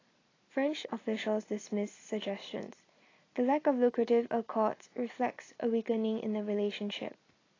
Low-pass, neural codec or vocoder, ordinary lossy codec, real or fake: 7.2 kHz; none; AAC, 32 kbps; real